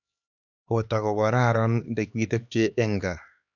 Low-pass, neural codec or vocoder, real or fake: 7.2 kHz; codec, 16 kHz, 2 kbps, X-Codec, HuBERT features, trained on LibriSpeech; fake